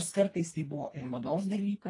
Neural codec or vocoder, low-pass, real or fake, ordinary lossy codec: codec, 24 kHz, 1.5 kbps, HILCodec; 10.8 kHz; fake; AAC, 32 kbps